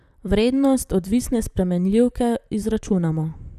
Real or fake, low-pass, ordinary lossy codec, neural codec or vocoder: fake; 14.4 kHz; none; vocoder, 44.1 kHz, 128 mel bands, Pupu-Vocoder